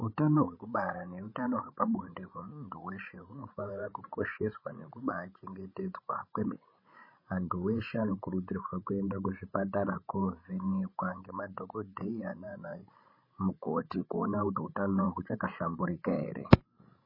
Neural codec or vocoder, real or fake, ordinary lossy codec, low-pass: codec, 16 kHz, 16 kbps, FreqCodec, larger model; fake; MP3, 24 kbps; 5.4 kHz